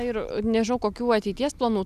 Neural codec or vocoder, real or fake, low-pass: none; real; 14.4 kHz